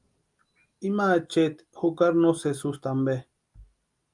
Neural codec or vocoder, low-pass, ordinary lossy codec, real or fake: none; 10.8 kHz; Opus, 32 kbps; real